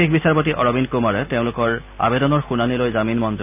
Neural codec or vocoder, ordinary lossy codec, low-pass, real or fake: none; none; 3.6 kHz; real